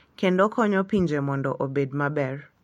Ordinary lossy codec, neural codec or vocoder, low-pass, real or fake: MP3, 64 kbps; none; 19.8 kHz; real